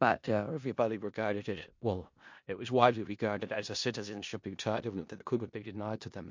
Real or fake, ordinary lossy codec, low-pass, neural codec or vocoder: fake; MP3, 48 kbps; 7.2 kHz; codec, 16 kHz in and 24 kHz out, 0.4 kbps, LongCat-Audio-Codec, four codebook decoder